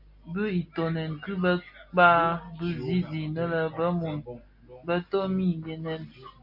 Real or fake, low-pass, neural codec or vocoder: real; 5.4 kHz; none